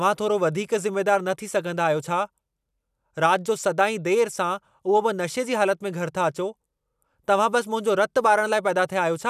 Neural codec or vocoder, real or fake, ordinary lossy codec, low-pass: none; real; none; 14.4 kHz